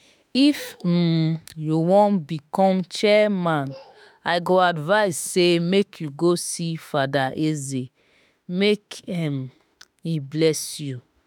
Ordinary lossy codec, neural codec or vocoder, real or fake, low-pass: none; autoencoder, 48 kHz, 32 numbers a frame, DAC-VAE, trained on Japanese speech; fake; none